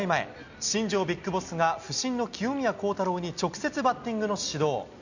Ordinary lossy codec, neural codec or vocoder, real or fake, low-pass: none; none; real; 7.2 kHz